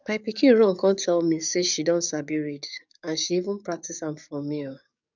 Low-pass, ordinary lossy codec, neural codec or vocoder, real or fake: 7.2 kHz; none; codec, 44.1 kHz, 7.8 kbps, DAC; fake